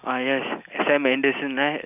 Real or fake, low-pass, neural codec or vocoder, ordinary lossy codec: real; 3.6 kHz; none; none